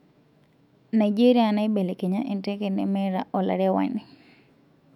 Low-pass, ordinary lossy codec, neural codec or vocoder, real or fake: 19.8 kHz; none; autoencoder, 48 kHz, 128 numbers a frame, DAC-VAE, trained on Japanese speech; fake